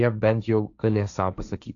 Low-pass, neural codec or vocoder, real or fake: 7.2 kHz; codec, 16 kHz, 1.1 kbps, Voila-Tokenizer; fake